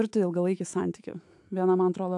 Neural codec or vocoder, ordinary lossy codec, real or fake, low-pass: autoencoder, 48 kHz, 128 numbers a frame, DAC-VAE, trained on Japanese speech; AAC, 64 kbps; fake; 10.8 kHz